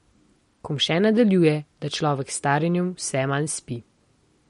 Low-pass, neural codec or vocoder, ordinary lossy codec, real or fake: 19.8 kHz; none; MP3, 48 kbps; real